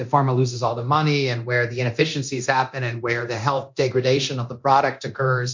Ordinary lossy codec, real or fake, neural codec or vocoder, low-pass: MP3, 48 kbps; fake; codec, 24 kHz, 0.9 kbps, DualCodec; 7.2 kHz